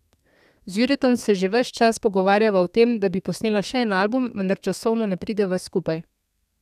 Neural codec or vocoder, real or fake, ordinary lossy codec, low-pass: codec, 32 kHz, 1.9 kbps, SNAC; fake; none; 14.4 kHz